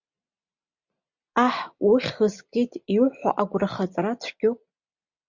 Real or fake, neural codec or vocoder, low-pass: real; none; 7.2 kHz